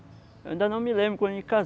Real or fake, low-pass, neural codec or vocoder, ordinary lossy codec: real; none; none; none